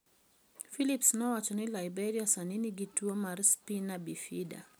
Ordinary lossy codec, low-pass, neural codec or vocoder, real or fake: none; none; none; real